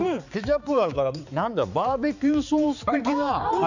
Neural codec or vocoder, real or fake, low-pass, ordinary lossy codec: codec, 16 kHz, 4 kbps, X-Codec, HuBERT features, trained on balanced general audio; fake; 7.2 kHz; none